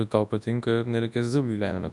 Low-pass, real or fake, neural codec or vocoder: 10.8 kHz; fake; codec, 24 kHz, 0.9 kbps, WavTokenizer, large speech release